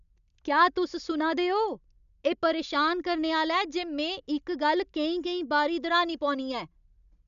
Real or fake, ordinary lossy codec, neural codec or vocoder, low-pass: real; none; none; 7.2 kHz